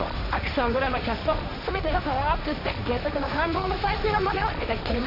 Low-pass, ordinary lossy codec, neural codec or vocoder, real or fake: 5.4 kHz; none; codec, 16 kHz, 1.1 kbps, Voila-Tokenizer; fake